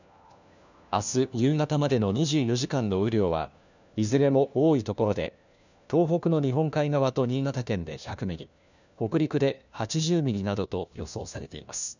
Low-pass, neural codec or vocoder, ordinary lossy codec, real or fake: 7.2 kHz; codec, 16 kHz, 1 kbps, FunCodec, trained on LibriTTS, 50 frames a second; none; fake